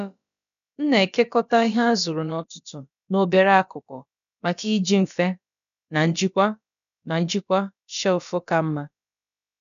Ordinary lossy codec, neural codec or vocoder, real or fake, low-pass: none; codec, 16 kHz, about 1 kbps, DyCAST, with the encoder's durations; fake; 7.2 kHz